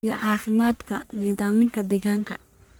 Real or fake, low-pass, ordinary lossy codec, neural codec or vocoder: fake; none; none; codec, 44.1 kHz, 1.7 kbps, Pupu-Codec